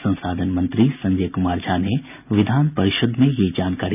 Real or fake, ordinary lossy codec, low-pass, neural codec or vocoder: real; none; 3.6 kHz; none